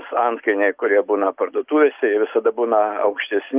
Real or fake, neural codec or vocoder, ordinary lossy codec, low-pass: real; none; Opus, 24 kbps; 3.6 kHz